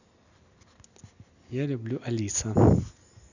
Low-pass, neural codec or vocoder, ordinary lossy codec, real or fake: 7.2 kHz; none; none; real